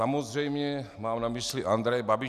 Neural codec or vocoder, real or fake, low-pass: none; real; 14.4 kHz